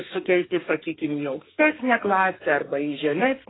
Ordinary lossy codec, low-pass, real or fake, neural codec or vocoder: AAC, 16 kbps; 7.2 kHz; fake; codec, 32 kHz, 1.9 kbps, SNAC